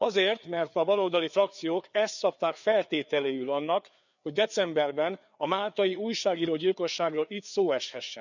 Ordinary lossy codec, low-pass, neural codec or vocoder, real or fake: none; 7.2 kHz; codec, 16 kHz, 4 kbps, FreqCodec, larger model; fake